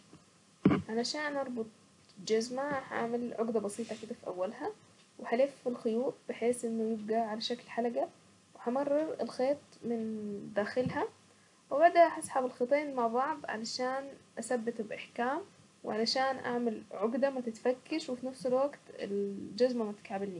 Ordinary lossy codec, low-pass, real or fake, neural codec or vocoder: none; 10.8 kHz; real; none